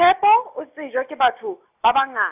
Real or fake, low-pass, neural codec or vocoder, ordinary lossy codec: real; 3.6 kHz; none; AAC, 32 kbps